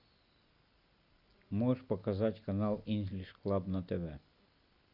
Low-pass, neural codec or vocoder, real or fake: 5.4 kHz; none; real